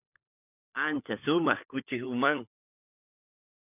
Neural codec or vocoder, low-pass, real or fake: codec, 16 kHz, 16 kbps, FunCodec, trained on LibriTTS, 50 frames a second; 3.6 kHz; fake